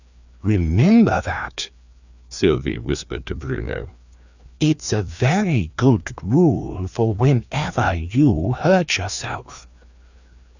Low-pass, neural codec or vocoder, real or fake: 7.2 kHz; codec, 16 kHz, 2 kbps, FreqCodec, larger model; fake